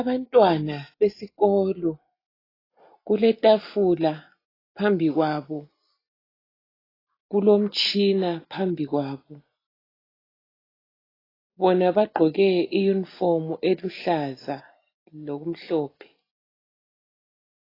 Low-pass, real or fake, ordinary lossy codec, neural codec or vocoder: 5.4 kHz; real; AAC, 24 kbps; none